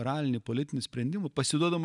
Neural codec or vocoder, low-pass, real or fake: none; 10.8 kHz; real